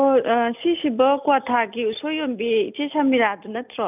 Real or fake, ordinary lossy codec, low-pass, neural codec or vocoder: real; none; 3.6 kHz; none